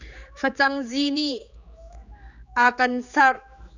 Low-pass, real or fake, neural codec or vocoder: 7.2 kHz; fake; codec, 16 kHz, 4 kbps, X-Codec, HuBERT features, trained on general audio